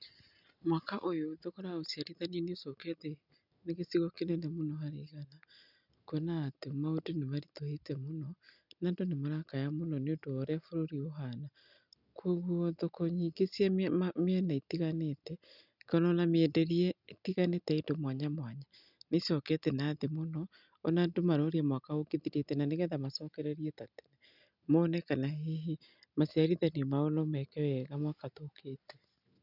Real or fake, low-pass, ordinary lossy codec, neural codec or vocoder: real; 5.4 kHz; none; none